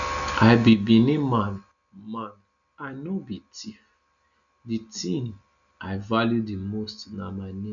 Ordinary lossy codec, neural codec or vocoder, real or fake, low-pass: none; none; real; 7.2 kHz